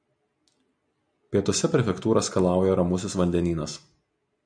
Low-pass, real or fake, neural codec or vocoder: 9.9 kHz; real; none